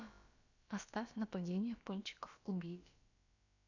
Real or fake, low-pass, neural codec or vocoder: fake; 7.2 kHz; codec, 16 kHz, about 1 kbps, DyCAST, with the encoder's durations